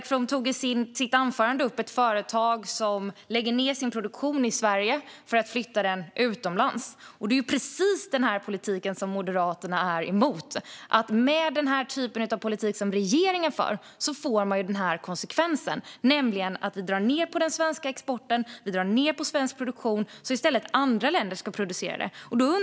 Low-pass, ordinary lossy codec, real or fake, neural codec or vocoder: none; none; real; none